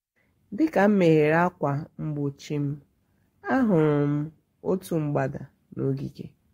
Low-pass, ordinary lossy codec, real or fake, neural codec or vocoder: 19.8 kHz; AAC, 48 kbps; fake; codec, 44.1 kHz, 7.8 kbps, Pupu-Codec